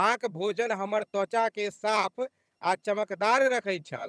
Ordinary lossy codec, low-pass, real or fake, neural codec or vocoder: none; none; fake; vocoder, 22.05 kHz, 80 mel bands, HiFi-GAN